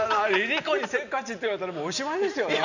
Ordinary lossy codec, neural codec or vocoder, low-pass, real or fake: none; none; 7.2 kHz; real